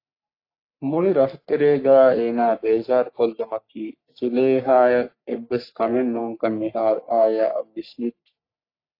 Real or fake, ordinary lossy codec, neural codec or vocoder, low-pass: fake; AAC, 32 kbps; codec, 44.1 kHz, 3.4 kbps, Pupu-Codec; 5.4 kHz